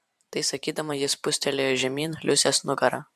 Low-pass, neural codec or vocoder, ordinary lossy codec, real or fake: 14.4 kHz; vocoder, 44.1 kHz, 128 mel bands every 512 samples, BigVGAN v2; AAC, 96 kbps; fake